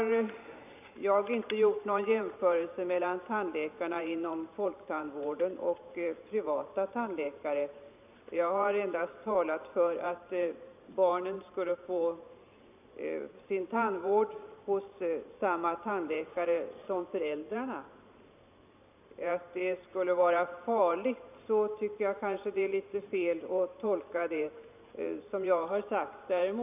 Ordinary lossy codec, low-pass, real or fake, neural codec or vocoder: none; 3.6 kHz; fake; vocoder, 44.1 kHz, 128 mel bands every 512 samples, BigVGAN v2